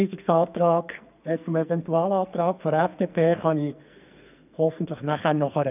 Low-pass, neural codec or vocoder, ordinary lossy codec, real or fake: 3.6 kHz; codec, 44.1 kHz, 2.6 kbps, SNAC; none; fake